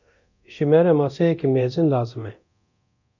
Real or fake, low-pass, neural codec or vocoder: fake; 7.2 kHz; codec, 24 kHz, 0.9 kbps, DualCodec